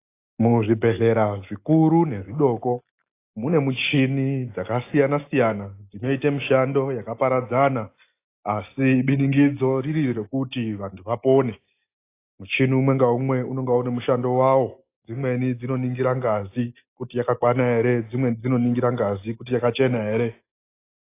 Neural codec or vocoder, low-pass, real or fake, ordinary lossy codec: none; 3.6 kHz; real; AAC, 24 kbps